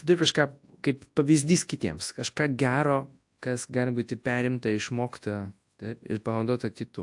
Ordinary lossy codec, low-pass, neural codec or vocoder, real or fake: AAC, 64 kbps; 10.8 kHz; codec, 24 kHz, 0.9 kbps, WavTokenizer, large speech release; fake